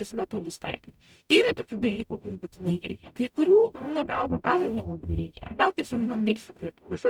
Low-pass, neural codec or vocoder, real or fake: 19.8 kHz; codec, 44.1 kHz, 0.9 kbps, DAC; fake